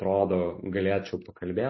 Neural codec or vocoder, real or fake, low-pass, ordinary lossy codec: none; real; 7.2 kHz; MP3, 24 kbps